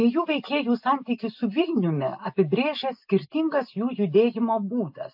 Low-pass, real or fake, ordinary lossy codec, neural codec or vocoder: 5.4 kHz; real; AAC, 48 kbps; none